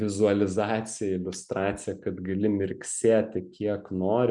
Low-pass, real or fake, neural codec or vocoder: 10.8 kHz; real; none